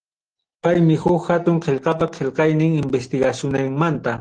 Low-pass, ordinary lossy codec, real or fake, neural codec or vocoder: 9.9 kHz; Opus, 16 kbps; real; none